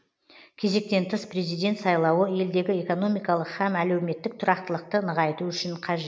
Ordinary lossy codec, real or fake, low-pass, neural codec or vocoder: none; real; none; none